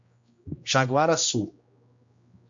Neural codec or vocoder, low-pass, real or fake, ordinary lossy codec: codec, 16 kHz, 1 kbps, X-Codec, HuBERT features, trained on general audio; 7.2 kHz; fake; MP3, 48 kbps